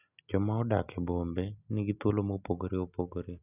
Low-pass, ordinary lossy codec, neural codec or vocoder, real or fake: 3.6 kHz; none; none; real